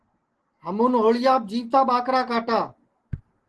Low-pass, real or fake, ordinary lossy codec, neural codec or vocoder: 10.8 kHz; real; Opus, 16 kbps; none